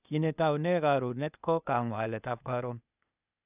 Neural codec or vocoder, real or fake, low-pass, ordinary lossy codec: codec, 16 kHz, 0.8 kbps, ZipCodec; fake; 3.6 kHz; none